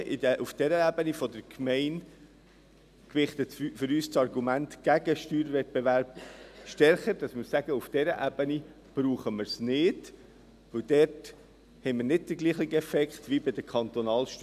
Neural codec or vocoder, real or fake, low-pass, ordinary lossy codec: none; real; 14.4 kHz; none